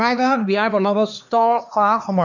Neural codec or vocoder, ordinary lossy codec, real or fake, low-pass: codec, 16 kHz, 2 kbps, X-Codec, HuBERT features, trained on LibriSpeech; none; fake; 7.2 kHz